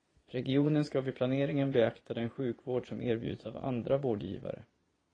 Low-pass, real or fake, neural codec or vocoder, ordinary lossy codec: 9.9 kHz; fake; vocoder, 22.05 kHz, 80 mel bands, Vocos; AAC, 32 kbps